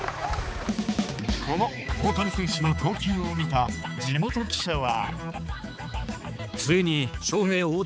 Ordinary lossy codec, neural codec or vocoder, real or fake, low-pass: none; codec, 16 kHz, 4 kbps, X-Codec, HuBERT features, trained on balanced general audio; fake; none